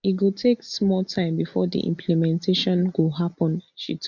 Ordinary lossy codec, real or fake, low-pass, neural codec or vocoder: none; real; 7.2 kHz; none